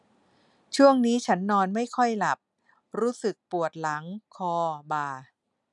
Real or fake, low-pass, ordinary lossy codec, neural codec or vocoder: real; 10.8 kHz; none; none